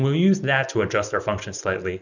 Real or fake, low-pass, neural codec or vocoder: real; 7.2 kHz; none